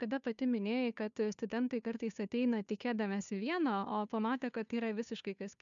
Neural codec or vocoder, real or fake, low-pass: codec, 16 kHz, 2 kbps, FunCodec, trained on Chinese and English, 25 frames a second; fake; 7.2 kHz